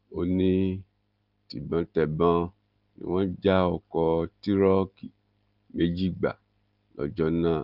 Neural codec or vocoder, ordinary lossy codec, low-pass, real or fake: none; Opus, 32 kbps; 5.4 kHz; real